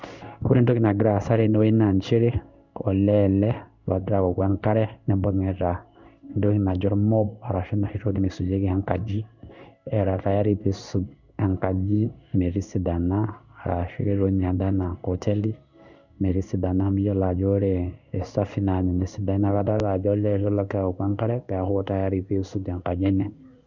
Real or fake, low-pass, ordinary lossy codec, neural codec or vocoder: fake; 7.2 kHz; none; codec, 16 kHz in and 24 kHz out, 1 kbps, XY-Tokenizer